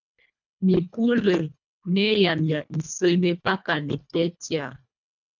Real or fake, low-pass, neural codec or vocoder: fake; 7.2 kHz; codec, 24 kHz, 1.5 kbps, HILCodec